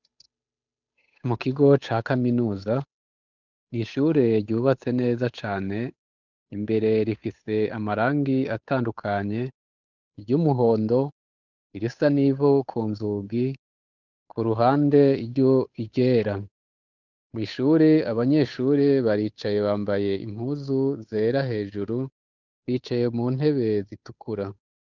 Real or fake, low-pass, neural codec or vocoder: fake; 7.2 kHz; codec, 16 kHz, 8 kbps, FunCodec, trained on Chinese and English, 25 frames a second